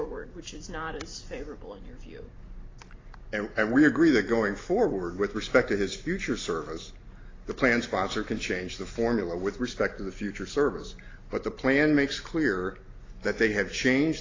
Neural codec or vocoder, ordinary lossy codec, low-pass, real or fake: none; AAC, 32 kbps; 7.2 kHz; real